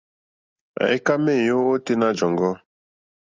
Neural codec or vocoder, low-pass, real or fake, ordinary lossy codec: none; 7.2 kHz; real; Opus, 32 kbps